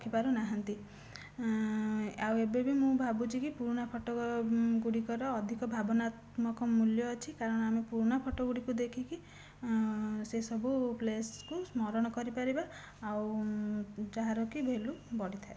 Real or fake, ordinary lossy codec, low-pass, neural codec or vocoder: real; none; none; none